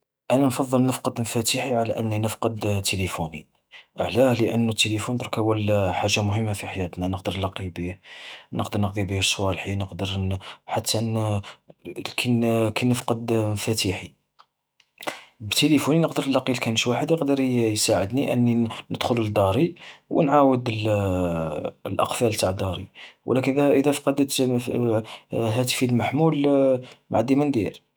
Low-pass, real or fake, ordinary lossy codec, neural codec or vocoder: none; fake; none; autoencoder, 48 kHz, 128 numbers a frame, DAC-VAE, trained on Japanese speech